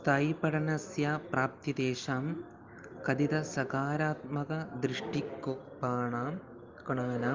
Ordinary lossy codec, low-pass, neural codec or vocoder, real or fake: Opus, 24 kbps; 7.2 kHz; none; real